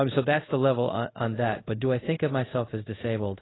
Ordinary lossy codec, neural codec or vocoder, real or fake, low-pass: AAC, 16 kbps; codec, 24 kHz, 0.5 kbps, DualCodec; fake; 7.2 kHz